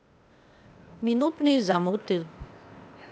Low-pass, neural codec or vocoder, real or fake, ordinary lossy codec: none; codec, 16 kHz, 0.8 kbps, ZipCodec; fake; none